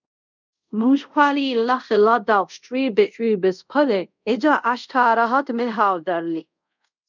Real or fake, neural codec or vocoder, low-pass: fake; codec, 24 kHz, 0.5 kbps, DualCodec; 7.2 kHz